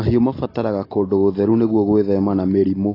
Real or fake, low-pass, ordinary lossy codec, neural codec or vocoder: real; 5.4 kHz; AAC, 24 kbps; none